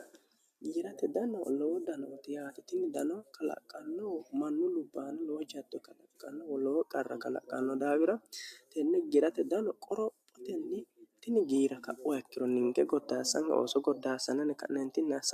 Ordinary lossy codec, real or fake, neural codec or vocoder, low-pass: AAC, 96 kbps; real; none; 14.4 kHz